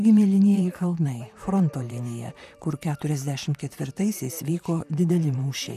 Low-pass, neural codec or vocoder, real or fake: 14.4 kHz; vocoder, 44.1 kHz, 128 mel bands, Pupu-Vocoder; fake